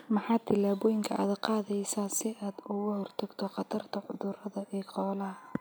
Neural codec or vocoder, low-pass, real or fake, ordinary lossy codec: none; none; real; none